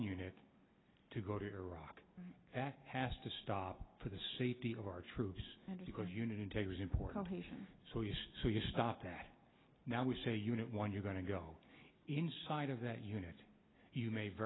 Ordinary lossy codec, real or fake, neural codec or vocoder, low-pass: AAC, 16 kbps; real; none; 7.2 kHz